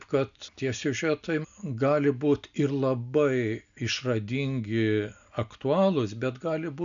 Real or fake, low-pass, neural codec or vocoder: real; 7.2 kHz; none